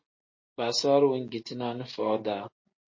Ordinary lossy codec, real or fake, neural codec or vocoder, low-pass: MP3, 32 kbps; real; none; 7.2 kHz